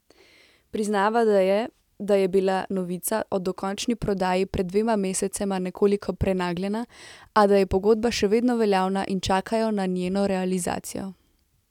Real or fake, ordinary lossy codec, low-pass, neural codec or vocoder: real; none; 19.8 kHz; none